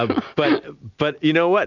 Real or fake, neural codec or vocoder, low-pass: real; none; 7.2 kHz